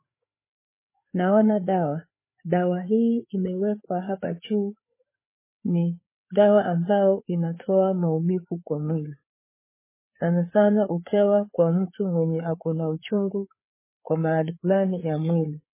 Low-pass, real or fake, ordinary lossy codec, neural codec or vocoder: 3.6 kHz; fake; MP3, 16 kbps; codec, 16 kHz, 4 kbps, FreqCodec, larger model